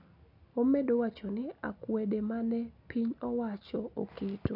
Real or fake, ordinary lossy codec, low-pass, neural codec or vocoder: real; none; 5.4 kHz; none